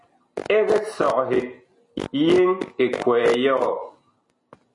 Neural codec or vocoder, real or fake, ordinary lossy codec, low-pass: none; real; MP3, 48 kbps; 10.8 kHz